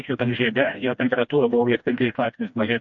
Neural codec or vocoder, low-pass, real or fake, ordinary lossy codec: codec, 16 kHz, 1 kbps, FreqCodec, smaller model; 7.2 kHz; fake; MP3, 48 kbps